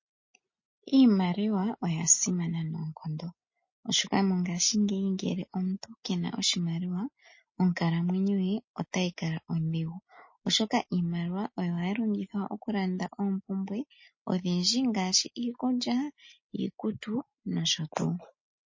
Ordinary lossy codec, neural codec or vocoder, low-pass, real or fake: MP3, 32 kbps; none; 7.2 kHz; real